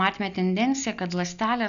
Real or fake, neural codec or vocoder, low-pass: real; none; 7.2 kHz